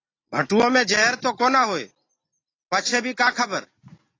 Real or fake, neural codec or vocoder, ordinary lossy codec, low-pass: real; none; AAC, 32 kbps; 7.2 kHz